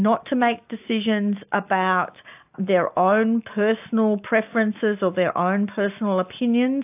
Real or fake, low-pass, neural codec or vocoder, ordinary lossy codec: real; 3.6 kHz; none; AAC, 32 kbps